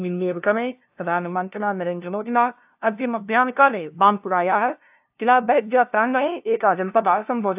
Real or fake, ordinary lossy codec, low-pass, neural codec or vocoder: fake; AAC, 32 kbps; 3.6 kHz; codec, 16 kHz, 0.5 kbps, FunCodec, trained on LibriTTS, 25 frames a second